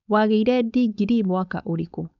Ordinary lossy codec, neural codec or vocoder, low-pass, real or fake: none; codec, 16 kHz, 4.8 kbps, FACodec; 7.2 kHz; fake